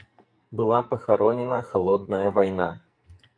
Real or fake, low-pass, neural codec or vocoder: fake; 9.9 kHz; codec, 44.1 kHz, 2.6 kbps, SNAC